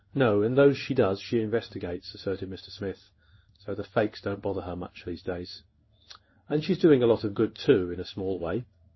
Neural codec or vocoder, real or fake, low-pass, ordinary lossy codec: codec, 16 kHz in and 24 kHz out, 1 kbps, XY-Tokenizer; fake; 7.2 kHz; MP3, 24 kbps